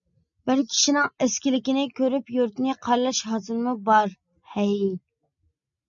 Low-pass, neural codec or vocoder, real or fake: 7.2 kHz; none; real